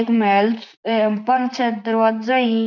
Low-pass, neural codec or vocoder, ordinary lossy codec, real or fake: 7.2 kHz; vocoder, 44.1 kHz, 128 mel bands, Pupu-Vocoder; none; fake